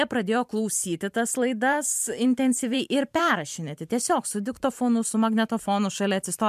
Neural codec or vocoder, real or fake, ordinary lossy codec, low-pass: vocoder, 44.1 kHz, 128 mel bands every 512 samples, BigVGAN v2; fake; MP3, 96 kbps; 14.4 kHz